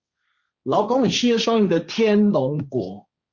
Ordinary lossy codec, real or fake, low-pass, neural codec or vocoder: Opus, 64 kbps; fake; 7.2 kHz; codec, 16 kHz, 1.1 kbps, Voila-Tokenizer